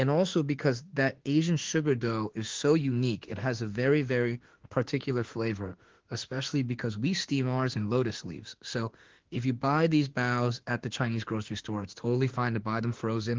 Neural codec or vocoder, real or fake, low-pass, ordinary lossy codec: autoencoder, 48 kHz, 32 numbers a frame, DAC-VAE, trained on Japanese speech; fake; 7.2 kHz; Opus, 16 kbps